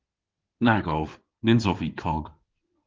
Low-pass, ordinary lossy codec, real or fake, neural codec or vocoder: 7.2 kHz; Opus, 16 kbps; fake; codec, 16 kHz in and 24 kHz out, 2.2 kbps, FireRedTTS-2 codec